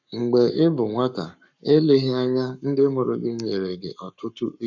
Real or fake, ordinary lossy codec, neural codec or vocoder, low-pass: fake; none; codec, 44.1 kHz, 7.8 kbps, Pupu-Codec; 7.2 kHz